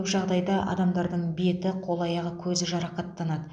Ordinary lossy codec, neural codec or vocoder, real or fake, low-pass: none; none; real; 9.9 kHz